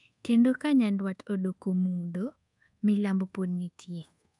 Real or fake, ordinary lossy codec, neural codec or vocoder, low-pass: fake; none; codec, 24 kHz, 1.2 kbps, DualCodec; 10.8 kHz